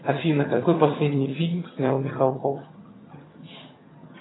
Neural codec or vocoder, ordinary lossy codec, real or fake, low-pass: vocoder, 22.05 kHz, 80 mel bands, HiFi-GAN; AAC, 16 kbps; fake; 7.2 kHz